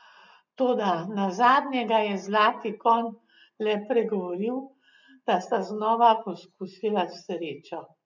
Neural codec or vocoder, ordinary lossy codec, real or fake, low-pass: none; none; real; 7.2 kHz